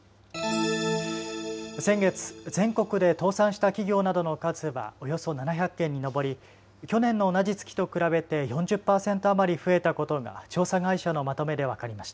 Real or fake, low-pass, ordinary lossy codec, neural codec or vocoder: real; none; none; none